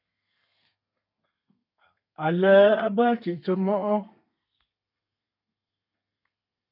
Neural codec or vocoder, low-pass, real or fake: codec, 32 kHz, 1.9 kbps, SNAC; 5.4 kHz; fake